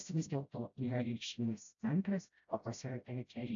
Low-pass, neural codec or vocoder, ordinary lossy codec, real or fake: 7.2 kHz; codec, 16 kHz, 0.5 kbps, FreqCodec, smaller model; AAC, 64 kbps; fake